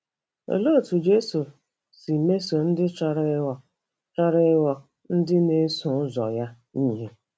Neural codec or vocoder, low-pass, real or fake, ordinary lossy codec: none; none; real; none